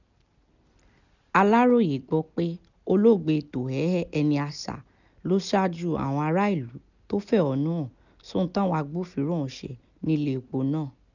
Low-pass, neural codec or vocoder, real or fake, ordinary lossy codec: 7.2 kHz; none; real; none